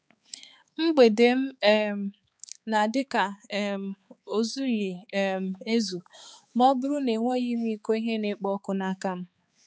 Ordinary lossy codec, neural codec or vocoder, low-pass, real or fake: none; codec, 16 kHz, 4 kbps, X-Codec, HuBERT features, trained on balanced general audio; none; fake